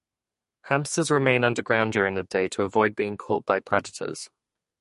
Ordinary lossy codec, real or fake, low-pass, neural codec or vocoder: MP3, 48 kbps; fake; 14.4 kHz; codec, 32 kHz, 1.9 kbps, SNAC